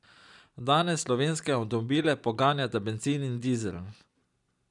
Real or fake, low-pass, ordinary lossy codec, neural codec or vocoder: real; 10.8 kHz; none; none